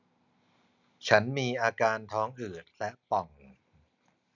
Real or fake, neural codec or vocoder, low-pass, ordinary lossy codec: real; none; 7.2 kHz; none